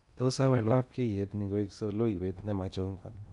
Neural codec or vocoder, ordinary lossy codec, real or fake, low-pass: codec, 16 kHz in and 24 kHz out, 0.8 kbps, FocalCodec, streaming, 65536 codes; none; fake; 10.8 kHz